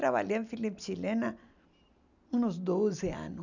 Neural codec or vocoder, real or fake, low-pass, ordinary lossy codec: none; real; 7.2 kHz; none